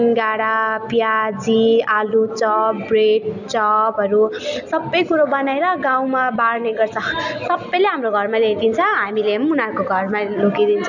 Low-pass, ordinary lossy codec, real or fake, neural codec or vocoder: 7.2 kHz; none; real; none